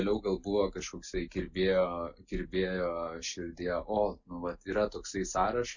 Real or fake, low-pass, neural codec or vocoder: real; 7.2 kHz; none